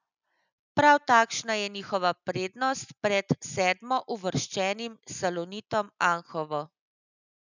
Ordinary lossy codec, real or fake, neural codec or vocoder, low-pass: none; real; none; 7.2 kHz